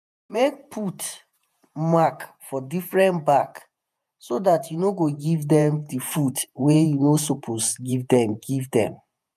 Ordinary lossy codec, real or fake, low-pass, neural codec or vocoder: none; fake; 14.4 kHz; vocoder, 48 kHz, 128 mel bands, Vocos